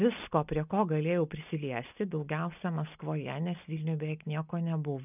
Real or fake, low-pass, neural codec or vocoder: real; 3.6 kHz; none